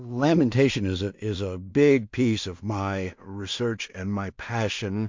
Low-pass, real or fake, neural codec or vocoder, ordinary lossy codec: 7.2 kHz; fake; codec, 16 kHz in and 24 kHz out, 0.4 kbps, LongCat-Audio-Codec, two codebook decoder; MP3, 48 kbps